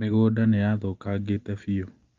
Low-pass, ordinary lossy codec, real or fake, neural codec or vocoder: 7.2 kHz; Opus, 32 kbps; real; none